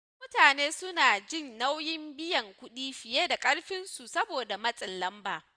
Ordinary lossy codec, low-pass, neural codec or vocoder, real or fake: none; 9.9 kHz; none; real